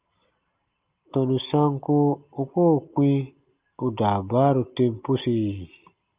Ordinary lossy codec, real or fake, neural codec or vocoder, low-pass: Opus, 24 kbps; real; none; 3.6 kHz